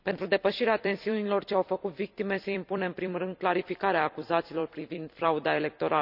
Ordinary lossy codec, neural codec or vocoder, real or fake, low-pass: none; vocoder, 44.1 kHz, 128 mel bands every 256 samples, BigVGAN v2; fake; 5.4 kHz